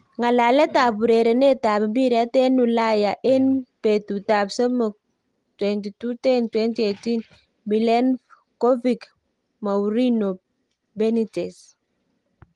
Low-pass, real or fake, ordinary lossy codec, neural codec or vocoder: 10.8 kHz; real; Opus, 24 kbps; none